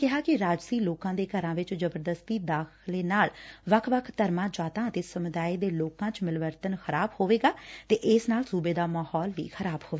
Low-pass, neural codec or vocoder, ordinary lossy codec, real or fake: none; none; none; real